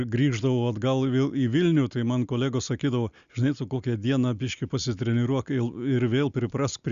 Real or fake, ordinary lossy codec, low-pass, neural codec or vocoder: real; Opus, 64 kbps; 7.2 kHz; none